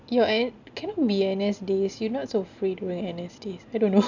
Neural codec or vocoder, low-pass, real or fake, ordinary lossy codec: none; 7.2 kHz; real; none